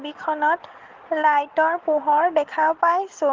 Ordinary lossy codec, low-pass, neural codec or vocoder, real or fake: Opus, 16 kbps; 7.2 kHz; none; real